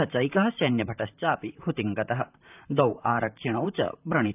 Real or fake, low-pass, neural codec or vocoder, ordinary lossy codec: fake; 3.6 kHz; vocoder, 44.1 kHz, 128 mel bands, Pupu-Vocoder; none